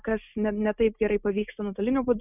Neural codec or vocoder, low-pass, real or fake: none; 3.6 kHz; real